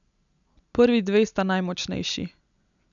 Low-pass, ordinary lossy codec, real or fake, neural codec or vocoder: 7.2 kHz; none; real; none